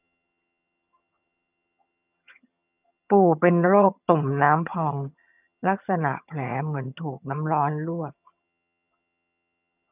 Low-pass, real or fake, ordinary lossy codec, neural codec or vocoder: 3.6 kHz; fake; none; vocoder, 22.05 kHz, 80 mel bands, HiFi-GAN